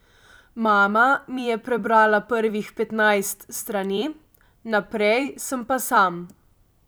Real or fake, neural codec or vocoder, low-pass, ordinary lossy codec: fake; vocoder, 44.1 kHz, 128 mel bands every 256 samples, BigVGAN v2; none; none